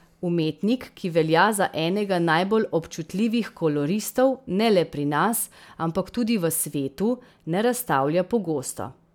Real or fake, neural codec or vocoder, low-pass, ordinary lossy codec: real; none; 19.8 kHz; none